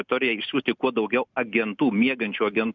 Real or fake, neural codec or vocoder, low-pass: real; none; 7.2 kHz